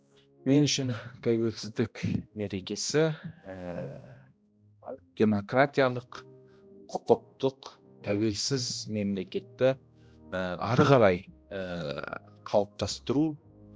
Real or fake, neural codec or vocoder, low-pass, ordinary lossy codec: fake; codec, 16 kHz, 1 kbps, X-Codec, HuBERT features, trained on balanced general audio; none; none